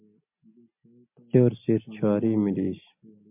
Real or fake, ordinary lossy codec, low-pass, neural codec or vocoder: real; MP3, 32 kbps; 3.6 kHz; none